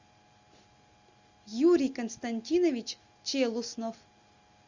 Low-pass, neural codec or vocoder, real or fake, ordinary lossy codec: 7.2 kHz; none; real; Opus, 64 kbps